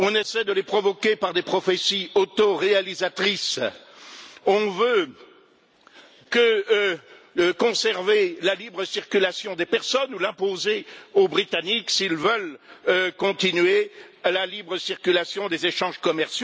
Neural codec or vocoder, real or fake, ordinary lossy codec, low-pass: none; real; none; none